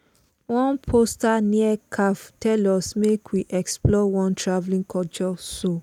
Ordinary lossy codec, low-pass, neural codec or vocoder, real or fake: none; 19.8 kHz; none; real